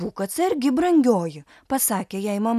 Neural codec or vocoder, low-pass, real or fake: none; 14.4 kHz; real